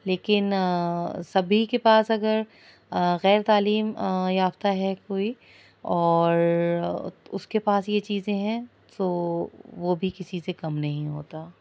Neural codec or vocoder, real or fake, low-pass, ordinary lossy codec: none; real; none; none